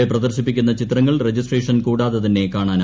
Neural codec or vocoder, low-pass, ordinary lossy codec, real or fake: none; none; none; real